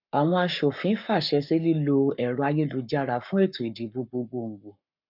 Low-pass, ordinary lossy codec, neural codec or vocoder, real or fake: 5.4 kHz; none; codec, 44.1 kHz, 7.8 kbps, Pupu-Codec; fake